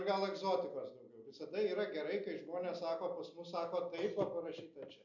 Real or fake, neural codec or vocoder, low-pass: real; none; 7.2 kHz